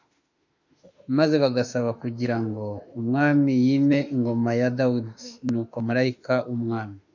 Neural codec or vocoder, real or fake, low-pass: autoencoder, 48 kHz, 32 numbers a frame, DAC-VAE, trained on Japanese speech; fake; 7.2 kHz